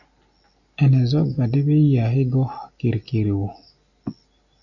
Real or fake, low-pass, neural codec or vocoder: real; 7.2 kHz; none